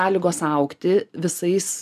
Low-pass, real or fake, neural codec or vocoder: 14.4 kHz; real; none